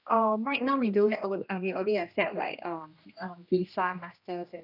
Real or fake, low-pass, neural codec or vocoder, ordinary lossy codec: fake; 5.4 kHz; codec, 16 kHz, 1 kbps, X-Codec, HuBERT features, trained on general audio; none